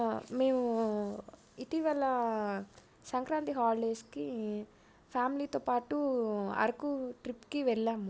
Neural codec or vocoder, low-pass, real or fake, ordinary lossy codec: none; none; real; none